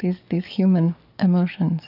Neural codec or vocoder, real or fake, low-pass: codec, 44.1 kHz, 7.8 kbps, DAC; fake; 5.4 kHz